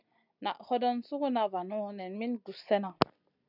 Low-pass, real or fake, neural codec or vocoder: 5.4 kHz; real; none